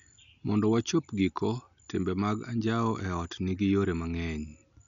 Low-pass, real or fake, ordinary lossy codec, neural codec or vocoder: 7.2 kHz; real; none; none